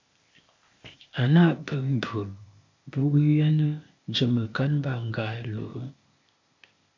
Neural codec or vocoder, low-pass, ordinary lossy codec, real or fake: codec, 16 kHz, 0.8 kbps, ZipCodec; 7.2 kHz; MP3, 48 kbps; fake